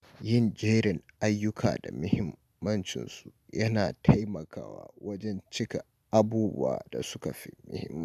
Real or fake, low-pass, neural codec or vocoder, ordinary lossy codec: real; 14.4 kHz; none; none